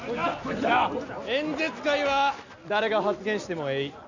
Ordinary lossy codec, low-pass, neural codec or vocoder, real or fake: none; 7.2 kHz; none; real